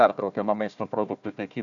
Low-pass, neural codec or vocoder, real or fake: 7.2 kHz; codec, 16 kHz, 1 kbps, FunCodec, trained on Chinese and English, 50 frames a second; fake